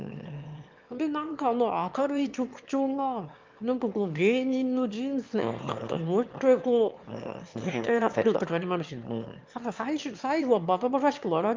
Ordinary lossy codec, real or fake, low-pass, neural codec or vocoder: Opus, 24 kbps; fake; 7.2 kHz; autoencoder, 22.05 kHz, a latent of 192 numbers a frame, VITS, trained on one speaker